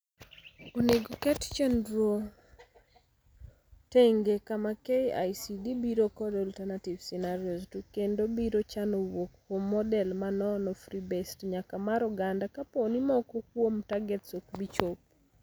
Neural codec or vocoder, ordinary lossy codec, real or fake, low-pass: none; none; real; none